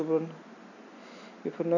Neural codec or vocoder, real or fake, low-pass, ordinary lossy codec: none; real; 7.2 kHz; none